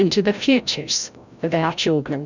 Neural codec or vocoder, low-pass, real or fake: codec, 16 kHz, 0.5 kbps, FreqCodec, larger model; 7.2 kHz; fake